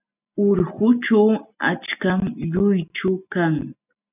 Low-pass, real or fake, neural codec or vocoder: 3.6 kHz; real; none